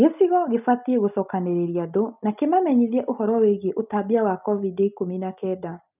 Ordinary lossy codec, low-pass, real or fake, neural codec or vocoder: none; 3.6 kHz; real; none